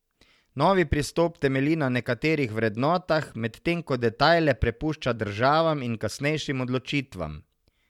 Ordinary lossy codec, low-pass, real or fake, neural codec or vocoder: MP3, 96 kbps; 19.8 kHz; real; none